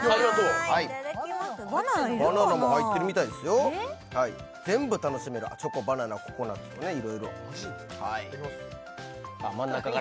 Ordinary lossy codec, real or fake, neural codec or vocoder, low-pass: none; real; none; none